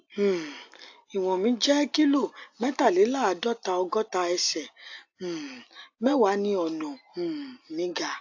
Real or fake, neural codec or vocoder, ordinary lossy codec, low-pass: real; none; AAC, 48 kbps; 7.2 kHz